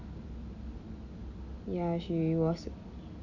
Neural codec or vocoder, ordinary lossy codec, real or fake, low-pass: none; none; real; 7.2 kHz